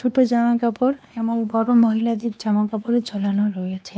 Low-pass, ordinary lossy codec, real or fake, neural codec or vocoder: none; none; fake; codec, 16 kHz, 2 kbps, X-Codec, WavLM features, trained on Multilingual LibriSpeech